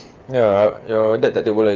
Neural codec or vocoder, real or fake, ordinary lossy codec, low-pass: codec, 16 kHz, 8 kbps, FreqCodec, smaller model; fake; Opus, 24 kbps; 7.2 kHz